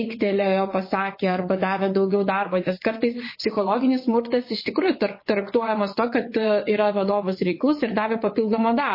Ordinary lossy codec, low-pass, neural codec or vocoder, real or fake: MP3, 24 kbps; 5.4 kHz; vocoder, 22.05 kHz, 80 mel bands, WaveNeXt; fake